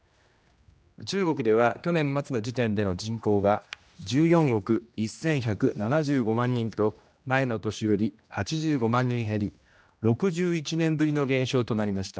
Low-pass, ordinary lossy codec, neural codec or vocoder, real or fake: none; none; codec, 16 kHz, 1 kbps, X-Codec, HuBERT features, trained on general audio; fake